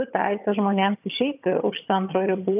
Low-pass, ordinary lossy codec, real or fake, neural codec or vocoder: 3.6 kHz; AAC, 32 kbps; fake; vocoder, 22.05 kHz, 80 mel bands, HiFi-GAN